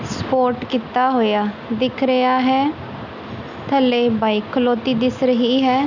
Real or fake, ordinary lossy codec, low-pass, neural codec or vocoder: real; none; 7.2 kHz; none